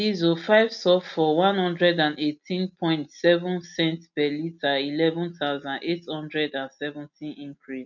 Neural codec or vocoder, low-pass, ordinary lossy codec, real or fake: none; 7.2 kHz; none; real